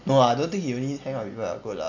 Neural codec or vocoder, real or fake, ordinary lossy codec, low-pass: none; real; none; 7.2 kHz